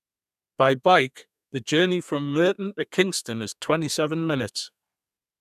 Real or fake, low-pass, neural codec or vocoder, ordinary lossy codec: fake; 14.4 kHz; codec, 32 kHz, 1.9 kbps, SNAC; none